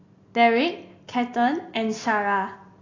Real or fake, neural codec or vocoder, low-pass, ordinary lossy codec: real; none; 7.2 kHz; AAC, 32 kbps